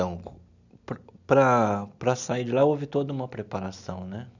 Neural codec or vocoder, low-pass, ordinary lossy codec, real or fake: none; 7.2 kHz; none; real